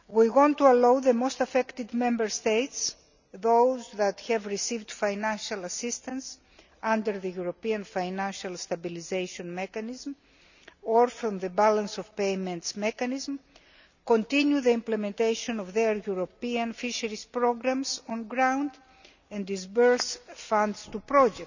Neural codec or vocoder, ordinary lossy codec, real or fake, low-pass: none; none; real; 7.2 kHz